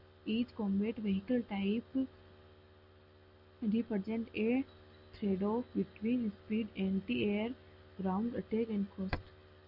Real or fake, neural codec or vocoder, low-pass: real; none; 5.4 kHz